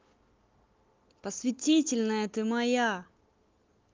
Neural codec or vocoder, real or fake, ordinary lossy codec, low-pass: none; real; Opus, 32 kbps; 7.2 kHz